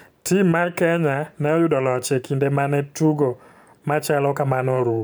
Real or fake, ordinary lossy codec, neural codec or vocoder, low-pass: fake; none; vocoder, 44.1 kHz, 128 mel bands every 512 samples, BigVGAN v2; none